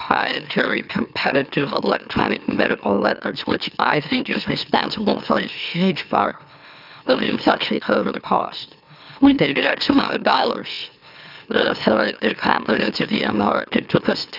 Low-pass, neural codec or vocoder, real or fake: 5.4 kHz; autoencoder, 44.1 kHz, a latent of 192 numbers a frame, MeloTTS; fake